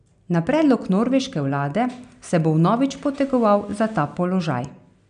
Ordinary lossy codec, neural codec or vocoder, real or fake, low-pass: none; none; real; 9.9 kHz